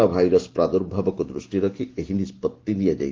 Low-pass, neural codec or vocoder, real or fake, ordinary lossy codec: 7.2 kHz; none; real; Opus, 16 kbps